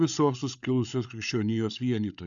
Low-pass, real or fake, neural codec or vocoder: 7.2 kHz; fake; codec, 16 kHz, 8 kbps, FreqCodec, larger model